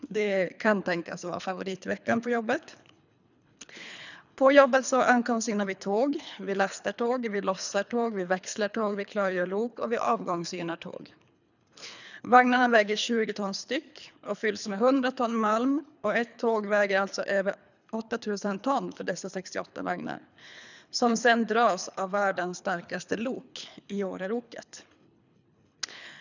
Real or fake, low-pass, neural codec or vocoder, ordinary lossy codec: fake; 7.2 kHz; codec, 24 kHz, 3 kbps, HILCodec; none